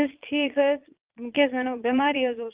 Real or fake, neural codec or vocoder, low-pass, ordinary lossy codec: fake; vocoder, 22.05 kHz, 80 mel bands, Vocos; 3.6 kHz; Opus, 24 kbps